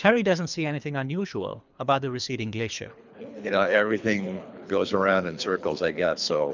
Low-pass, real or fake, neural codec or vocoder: 7.2 kHz; fake; codec, 24 kHz, 3 kbps, HILCodec